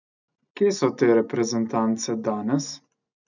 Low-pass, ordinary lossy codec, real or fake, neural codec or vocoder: 7.2 kHz; none; real; none